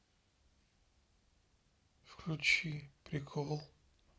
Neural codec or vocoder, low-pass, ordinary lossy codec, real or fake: none; none; none; real